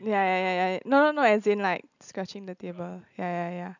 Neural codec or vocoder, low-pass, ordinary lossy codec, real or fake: none; 7.2 kHz; none; real